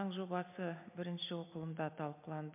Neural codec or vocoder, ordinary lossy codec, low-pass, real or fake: none; MP3, 32 kbps; 3.6 kHz; real